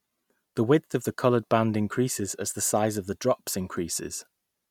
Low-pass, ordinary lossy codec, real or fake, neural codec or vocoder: 19.8 kHz; MP3, 96 kbps; real; none